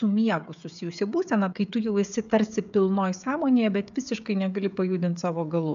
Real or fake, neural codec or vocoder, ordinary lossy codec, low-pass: fake; codec, 16 kHz, 16 kbps, FreqCodec, smaller model; AAC, 96 kbps; 7.2 kHz